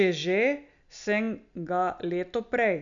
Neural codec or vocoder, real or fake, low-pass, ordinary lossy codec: none; real; 7.2 kHz; none